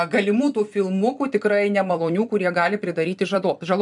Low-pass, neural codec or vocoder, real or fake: 10.8 kHz; vocoder, 44.1 kHz, 128 mel bands every 256 samples, BigVGAN v2; fake